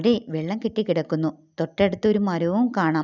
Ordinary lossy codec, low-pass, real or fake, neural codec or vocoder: none; 7.2 kHz; real; none